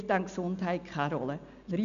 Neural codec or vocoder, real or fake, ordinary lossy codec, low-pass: none; real; none; 7.2 kHz